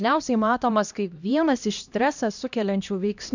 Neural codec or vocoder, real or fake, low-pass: codec, 16 kHz, 1 kbps, X-Codec, HuBERT features, trained on LibriSpeech; fake; 7.2 kHz